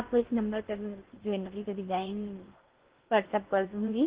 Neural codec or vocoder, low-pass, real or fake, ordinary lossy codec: codec, 16 kHz in and 24 kHz out, 0.8 kbps, FocalCodec, streaming, 65536 codes; 3.6 kHz; fake; Opus, 16 kbps